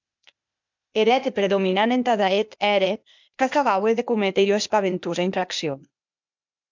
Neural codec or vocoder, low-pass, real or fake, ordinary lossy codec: codec, 16 kHz, 0.8 kbps, ZipCodec; 7.2 kHz; fake; MP3, 64 kbps